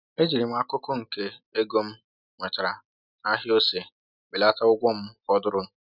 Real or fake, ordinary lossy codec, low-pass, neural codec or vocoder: real; none; 5.4 kHz; none